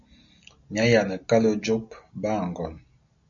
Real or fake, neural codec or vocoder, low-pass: real; none; 7.2 kHz